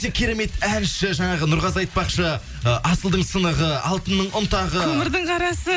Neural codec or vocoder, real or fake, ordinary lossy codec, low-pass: none; real; none; none